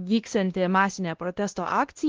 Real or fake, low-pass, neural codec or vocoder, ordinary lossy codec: fake; 7.2 kHz; codec, 16 kHz, 1 kbps, X-Codec, WavLM features, trained on Multilingual LibriSpeech; Opus, 16 kbps